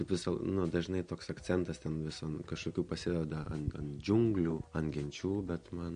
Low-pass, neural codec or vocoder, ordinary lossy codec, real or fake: 9.9 kHz; none; MP3, 48 kbps; real